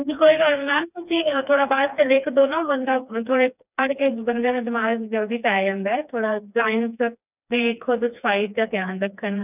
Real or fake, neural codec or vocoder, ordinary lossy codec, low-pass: fake; codec, 16 kHz, 2 kbps, FreqCodec, smaller model; none; 3.6 kHz